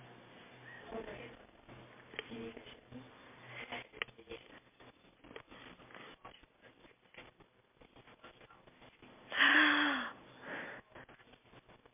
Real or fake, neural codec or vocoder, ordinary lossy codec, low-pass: real; none; MP3, 24 kbps; 3.6 kHz